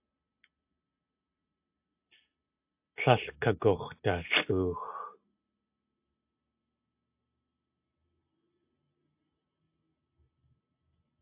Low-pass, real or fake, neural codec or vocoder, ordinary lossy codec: 3.6 kHz; real; none; AAC, 32 kbps